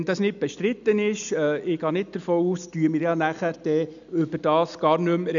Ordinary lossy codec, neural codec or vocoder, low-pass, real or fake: none; none; 7.2 kHz; real